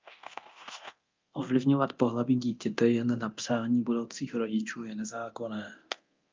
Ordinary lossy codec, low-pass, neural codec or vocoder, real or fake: Opus, 24 kbps; 7.2 kHz; codec, 24 kHz, 0.9 kbps, DualCodec; fake